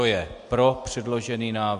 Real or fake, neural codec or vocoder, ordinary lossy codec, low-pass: real; none; MP3, 64 kbps; 10.8 kHz